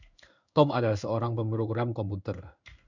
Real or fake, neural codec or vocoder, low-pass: fake; codec, 16 kHz in and 24 kHz out, 1 kbps, XY-Tokenizer; 7.2 kHz